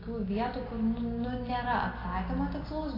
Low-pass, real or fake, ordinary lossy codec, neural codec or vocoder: 5.4 kHz; real; AAC, 24 kbps; none